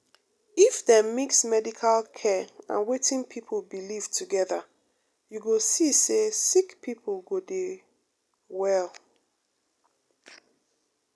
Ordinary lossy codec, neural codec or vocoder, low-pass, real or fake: none; none; none; real